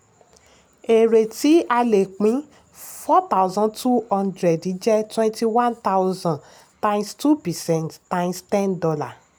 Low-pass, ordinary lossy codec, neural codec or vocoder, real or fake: none; none; none; real